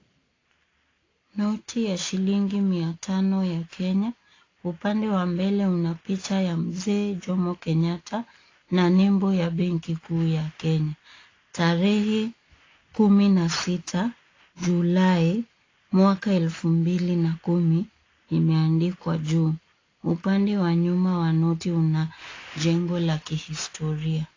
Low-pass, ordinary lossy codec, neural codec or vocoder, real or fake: 7.2 kHz; AAC, 32 kbps; none; real